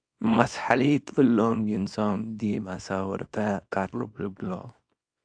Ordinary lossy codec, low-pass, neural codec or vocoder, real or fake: AAC, 64 kbps; 9.9 kHz; codec, 24 kHz, 0.9 kbps, WavTokenizer, small release; fake